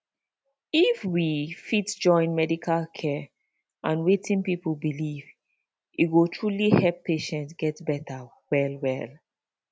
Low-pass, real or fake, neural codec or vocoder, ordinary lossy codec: none; real; none; none